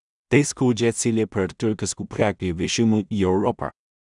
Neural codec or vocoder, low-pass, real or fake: codec, 16 kHz in and 24 kHz out, 0.4 kbps, LongCat-Audio-Codec, two codebook decoder; 10.8 kHz; fake